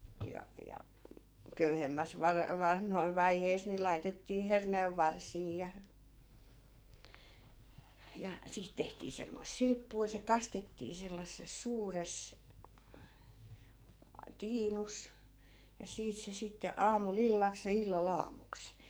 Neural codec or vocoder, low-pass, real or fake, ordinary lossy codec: codec, 44.1 kHz, 2.6 kbps, SNAC; none; fake; none